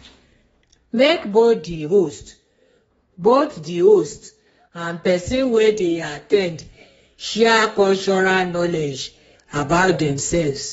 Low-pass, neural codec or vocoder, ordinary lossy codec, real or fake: 14.4 kHz; codec, 32 kHz, 1.9 kbps, SNAC; AAC, 24 kbps; fake